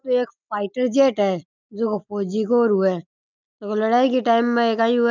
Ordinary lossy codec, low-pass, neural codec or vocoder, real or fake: none; 7.2 kHz; none; real